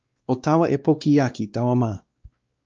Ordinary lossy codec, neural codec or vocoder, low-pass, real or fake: Opus, 24 kbps; codec, 16 kHz, 2 kbps, X-Codec, WavLM features, trained on Multilingual LibriSpeech; 7.2 kHz; fake